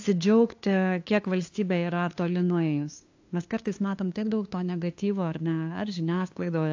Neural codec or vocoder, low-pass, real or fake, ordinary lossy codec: codec, 16 kHz, 2 kbps, FunCodec, trained on LibriTTS, 25 frames a second; 7.2 kHz; fake; AAC, 48 kbps